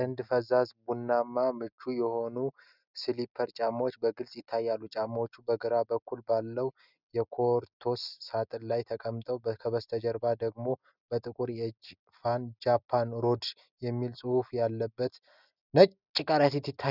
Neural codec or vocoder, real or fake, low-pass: none; real; 5.4 kHz